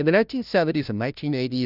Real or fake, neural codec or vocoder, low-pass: fake; codec, 16 kHz, 0.5 kbps, FunCodec, trained on Chinese and English, 25 frames a second; 5.4 kHz